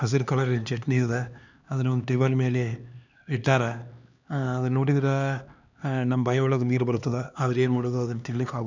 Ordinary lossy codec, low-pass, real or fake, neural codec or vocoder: none; 7.2 kHz; fake; codec, 16 kHz, 2 kbps, X-Codec, HuBERT features, trained on LibriSpeech